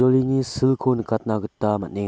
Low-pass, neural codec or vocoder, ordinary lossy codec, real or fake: none; none; none; real